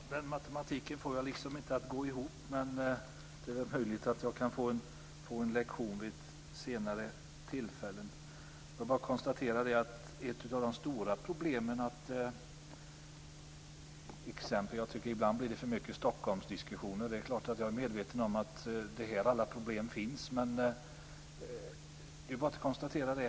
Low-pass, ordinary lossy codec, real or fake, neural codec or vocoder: none; none; real; none